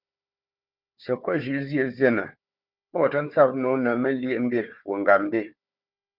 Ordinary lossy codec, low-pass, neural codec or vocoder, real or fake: Opus, 64 kbps; 5.4 kHz; codec, 16 kHz, 4 kbps, FunCodec, trained on Chinese and English, 50 frames a second; fake